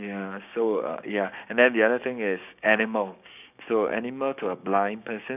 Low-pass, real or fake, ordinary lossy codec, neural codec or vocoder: 3.6 kHz; fake; none; codec, 16 kHz, 6 kbps, DAC